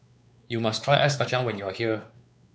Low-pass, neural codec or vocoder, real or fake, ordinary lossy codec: none; codec, 16 kHz, 4 kbps, X-Codec, WavLM features, trained on Multilingual LibriSpeech; fake; none